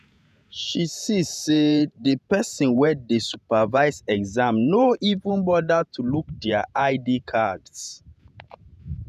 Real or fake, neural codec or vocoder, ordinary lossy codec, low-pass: fake; vocoder, 48 kHz, 128 mel bands, Vocos; none; 14.4 kHz